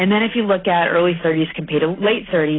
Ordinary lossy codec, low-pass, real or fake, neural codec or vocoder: AAC, 16 kbps; 7.2 kHz; real; none